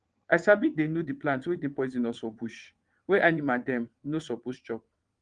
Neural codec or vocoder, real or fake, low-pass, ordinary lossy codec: vocoder, 22.05 kHz, 80 mel bands, WaveNeXt; fake; 9.9 kHz; Opus, 24 kbps